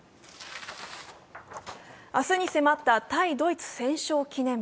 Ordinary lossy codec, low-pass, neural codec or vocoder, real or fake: none; none; none; real